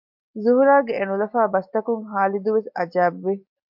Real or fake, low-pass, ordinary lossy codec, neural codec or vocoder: real; 5.4 kHz; MP3, 48 kbps; none